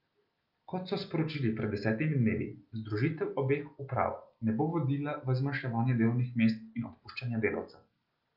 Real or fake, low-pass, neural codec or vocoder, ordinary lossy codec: real; 5.4 kHz; none; Opus, 32 kbps